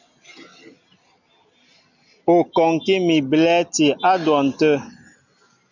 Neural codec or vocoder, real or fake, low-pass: none; real; 7.2 kHz